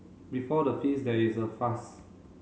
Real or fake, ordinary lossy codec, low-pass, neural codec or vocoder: real; none; none; none